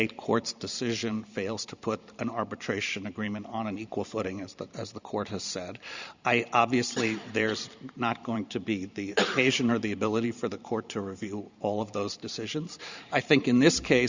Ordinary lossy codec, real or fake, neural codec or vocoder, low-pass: Opus, 64 kbps; fake; vocoder, 44.1 kHz, 128 mel bands every 512 samples, BigVGAN v2; 7.2 kHz